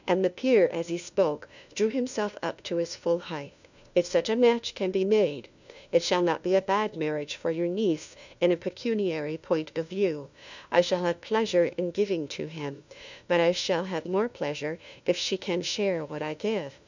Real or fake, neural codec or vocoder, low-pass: fake; codec, 16 kHz, 1 kbps, FunCodec, trained on LibriTTS, 50 frames a second; 7.2 kHz